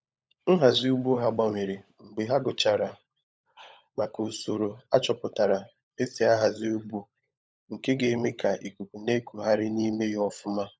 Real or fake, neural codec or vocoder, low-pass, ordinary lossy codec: fake; codec, 16 kHz, 16 kbps, FunCodec, trained on LibriTTS, 50 frames a second; none; none